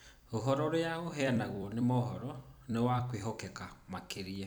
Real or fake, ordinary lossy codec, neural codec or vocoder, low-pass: fake; none; vocoder, 44.1 kHz, 128 mel bands every 256 samples, BigVGAN v2; none